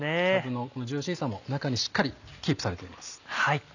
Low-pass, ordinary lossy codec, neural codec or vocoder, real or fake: 7.2 kHz; none; none; real